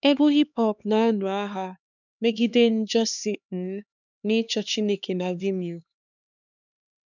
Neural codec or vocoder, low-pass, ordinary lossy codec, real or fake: codec, 16 kHz, 2 kbps, X-Codec, HuBERT features, trained on LibriSpeech; 7.2 kHz; none; fake